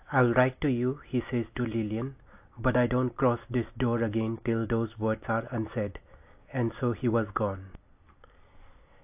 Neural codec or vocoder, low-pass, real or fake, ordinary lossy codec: none; 3.6 kHz; real; AAC, 32 kbps